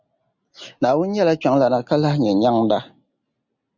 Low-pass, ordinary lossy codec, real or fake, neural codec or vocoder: 7.2 kHz; Opus, 64 kbps; real; none